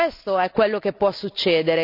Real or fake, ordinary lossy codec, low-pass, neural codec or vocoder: real; none; 5.4 kHz; none